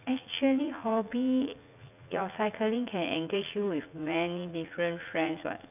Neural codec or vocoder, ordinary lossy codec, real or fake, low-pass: vocoder, 44.1 kHz, 80 mel bands, Vocos; none; fake; 3.6 kHz